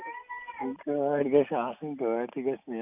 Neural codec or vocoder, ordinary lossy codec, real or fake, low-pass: none; none; real; 3.6 kHz